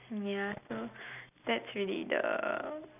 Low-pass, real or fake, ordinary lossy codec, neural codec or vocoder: 3.6 kHz; real; none; none